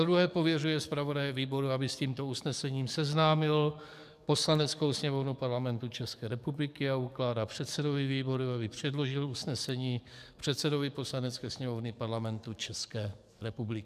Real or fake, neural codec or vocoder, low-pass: fake; codec, 44.1 kHz, 7.8 kbps, DAC; 14.4 kHz